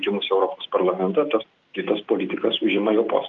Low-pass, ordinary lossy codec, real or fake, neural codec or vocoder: 7.2 kHz; Opus, 24 kbps; real; none